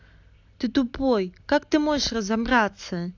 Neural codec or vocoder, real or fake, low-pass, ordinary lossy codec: none; real; 7.2 kHz; AAC, 48 kbps